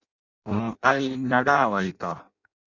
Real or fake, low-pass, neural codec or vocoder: fake; 7.2 kHz; codec, 16 kHz in and 24 kHz out, 0.6 kbps, FireRedTTS-2 codec